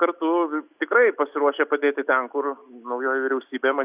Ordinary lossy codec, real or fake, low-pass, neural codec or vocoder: Opus, 64 kbps; real; 3.6 kHz; none